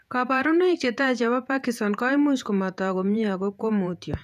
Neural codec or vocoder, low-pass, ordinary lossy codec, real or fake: vocoder, 48 kHz, 128 mel bands, Vocos; 14.4 kHz; none; fake